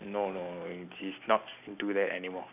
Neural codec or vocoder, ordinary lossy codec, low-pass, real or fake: none; none; 3.6 kHz; real